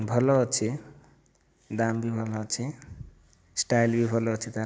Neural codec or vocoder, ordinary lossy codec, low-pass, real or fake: none; none; none; real